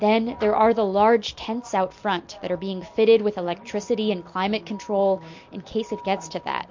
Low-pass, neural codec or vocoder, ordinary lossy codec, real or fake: 7.2 kHz; none; MP3, 48 kbps; real